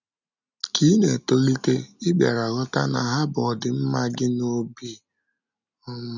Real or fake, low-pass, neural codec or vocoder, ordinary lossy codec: real; 7.2 kHz; none; none